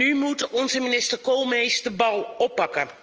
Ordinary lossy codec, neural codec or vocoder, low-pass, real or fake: Opus, 24 kbps; none; 7.2 kHz; real